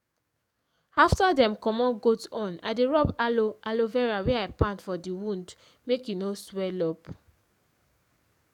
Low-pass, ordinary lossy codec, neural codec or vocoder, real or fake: 19.8 kHz; none; codec, 44.1 kHz, 7.8 kbps, DAC; fake